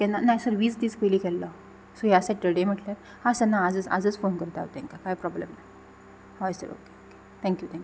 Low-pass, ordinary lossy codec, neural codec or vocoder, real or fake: none; none; none; real